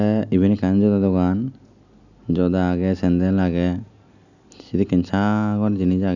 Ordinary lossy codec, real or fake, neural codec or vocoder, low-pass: none; real; none; 7.2 kHz